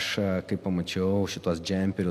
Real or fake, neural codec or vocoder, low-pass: real; none; 14.4 kHz